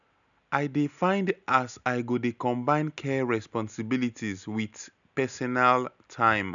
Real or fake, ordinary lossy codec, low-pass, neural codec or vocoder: real; none; 7.2 kHz; none